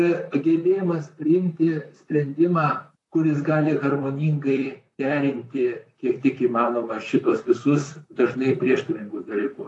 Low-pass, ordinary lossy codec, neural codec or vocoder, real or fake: 10.8 kHz; AAC, 48 kbps; vocoder, 44.1 kHz, 128 mel bands, Pupu-Vocoder; fake